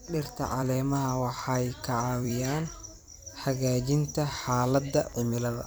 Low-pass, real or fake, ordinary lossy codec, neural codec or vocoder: none; fake; none; vocoder, 44.1 kHz, 128 mel bands every 256 samples, BigVGAN v2